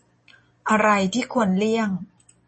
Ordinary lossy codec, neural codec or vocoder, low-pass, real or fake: MP3, 32 kbps; none; 10.8 kHz; real